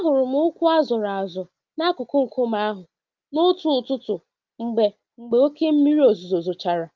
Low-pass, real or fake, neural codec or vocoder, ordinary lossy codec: 7.2 kHz; real; none; Opus, 24 kbps